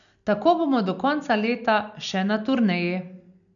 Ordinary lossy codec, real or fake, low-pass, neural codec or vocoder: none; real; 7.2 kHz; none